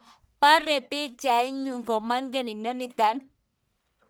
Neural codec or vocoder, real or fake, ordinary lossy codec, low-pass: codec, 44.1 kHz, 1.7 kbps, Pupu-Codec; fake; none; none